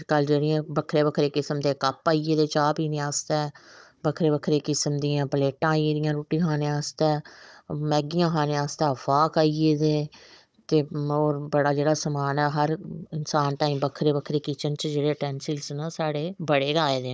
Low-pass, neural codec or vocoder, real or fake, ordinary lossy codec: none; codec, 16 kHz, 16 kbps, FunCodec, trained on Chinese and English, 50 frames a second; fake; none